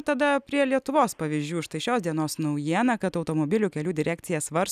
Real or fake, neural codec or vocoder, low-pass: real; none; 14.4 kHz